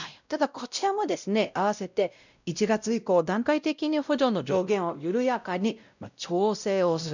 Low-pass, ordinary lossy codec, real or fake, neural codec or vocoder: 7.2 kHz; none; fake; codec, 16 kHz, 0.5 kbps, X-Codec, WavLM features, trained on Multilingual LibriSpeech